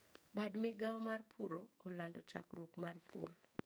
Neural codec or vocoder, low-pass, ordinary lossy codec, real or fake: codec, 44.1 kHz, 2.6 kbps, SNAC; none; none; fake